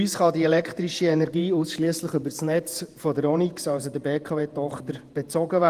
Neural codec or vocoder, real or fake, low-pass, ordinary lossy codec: vocoder, 48 kHz, 128 mel bands, Vocos; fake; 14.4 kHz; Opus, 24 kbps